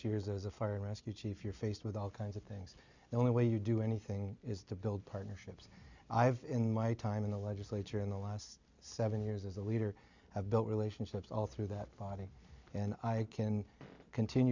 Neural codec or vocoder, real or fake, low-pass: none; real; 7.2 kHz